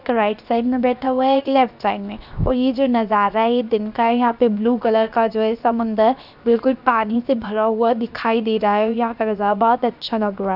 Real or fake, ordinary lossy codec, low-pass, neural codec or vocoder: fake; none; 5.4 kHz; codec, 16 kHz, 0.7 kbps, FocalCodec